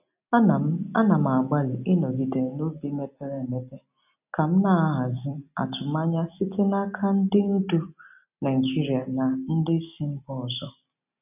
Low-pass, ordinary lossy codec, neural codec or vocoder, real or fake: 3.6 kHz; none; none; real